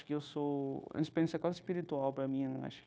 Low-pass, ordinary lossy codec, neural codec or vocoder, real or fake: none; none; codec, 16 kHz, 0.9 kbps, LongCat-Audio-Codec; fake